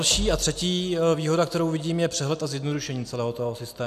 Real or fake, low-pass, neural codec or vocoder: real; 14.4 kHz; none